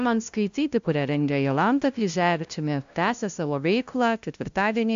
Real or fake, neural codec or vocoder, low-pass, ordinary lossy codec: fake; codec, 16 kHz, 0.5 kbps, FunCodec, trained on LibriTTS, 25 frames a second; 7.2 kHz; AAC, 64 kbps